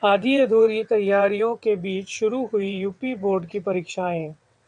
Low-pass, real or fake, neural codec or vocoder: 9.9 kHz; fake; vocoder, 22.05 kHz, 80 mel bands, WaveNeXt